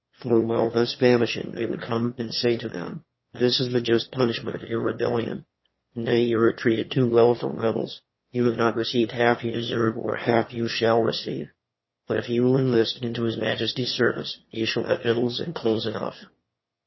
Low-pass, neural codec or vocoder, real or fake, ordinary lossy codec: 7.2 kHz; autoencoder, 22.05 kHz, a latent of 192 numbers a frame, VITS, trained on one speaker; fake; MP3, 24 kbps